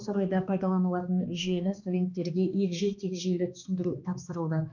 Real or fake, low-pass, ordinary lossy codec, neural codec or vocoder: fake; 7.2 kHz; none; codec, 16 kHz, 2 kbps, X-Codec, HuBERT features, trained on balanced general audio